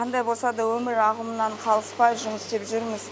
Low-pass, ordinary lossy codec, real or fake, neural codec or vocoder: none; none; real; none